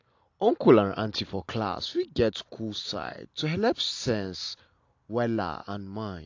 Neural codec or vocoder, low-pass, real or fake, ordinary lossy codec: none; 7.2 kHz; real; AAC, 48 kbps